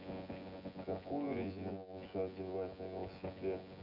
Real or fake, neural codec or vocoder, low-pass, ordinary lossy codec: fake; vocoder, 24 kHz, 100 mel bands, Vocos; 5.4 kHz; none